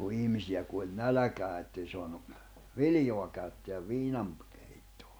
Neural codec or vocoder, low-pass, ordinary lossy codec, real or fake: none; none; none; real